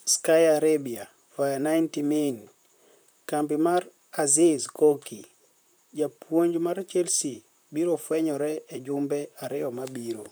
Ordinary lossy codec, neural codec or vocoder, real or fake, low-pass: none; vocoder, 44.1 kHz, 128 mel bands, Pupu-Vocoder; fake; none